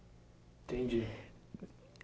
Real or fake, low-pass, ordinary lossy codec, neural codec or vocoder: real; none; none; none